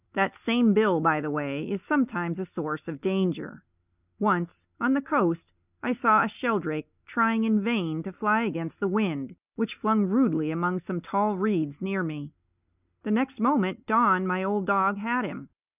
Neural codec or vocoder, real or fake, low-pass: none; real; 3.6 kHz